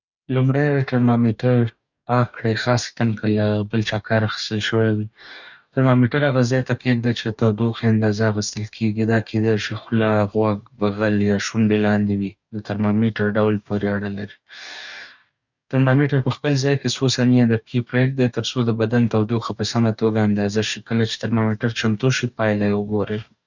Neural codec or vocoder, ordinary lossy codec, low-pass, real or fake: codec, 44.1 kHz, 2.6 kbps, DAC; none; 7.2 kHz; fake